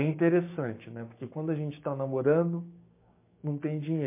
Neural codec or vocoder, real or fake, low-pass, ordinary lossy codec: codec, 16 kHz, 6 kbps, DAC; fake; 3.6 kHz; MP3, 24 kbps